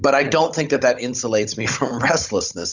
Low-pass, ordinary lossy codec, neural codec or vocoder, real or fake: 7.2 kHz; Opus, 64 kbps; codec, 16 kHz, 16 kbps, FunCodec, trained on Chinese and English, 50 frames a second; fake